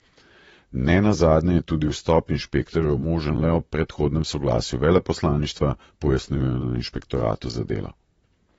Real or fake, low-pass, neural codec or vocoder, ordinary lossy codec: fake; 19.8 kHz; vocoder, 44.1 kHz, 128 mel bands, Pupu-Vocoder; AAC, 24 kbps